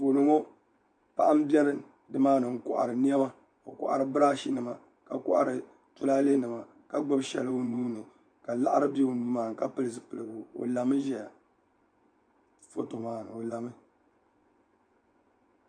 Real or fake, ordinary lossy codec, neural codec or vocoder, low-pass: fake; MP3, 64 kbps; vocoder, 24 kHz, 100 mel bands, Vocos; 9.9 kHz